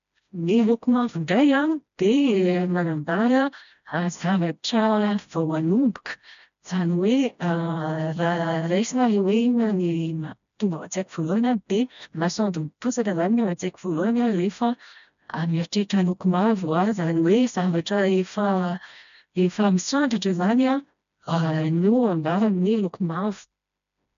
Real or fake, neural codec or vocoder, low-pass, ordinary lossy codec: fake; codec, 16 kHz, 1 kbps, FreqCodec, smaller model; 7.2 kHz; none